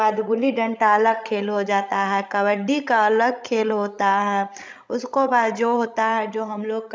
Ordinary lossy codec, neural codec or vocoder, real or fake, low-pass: none; codec, 16 kHz, 16 kbps, FreqCodec, larger model; fake; none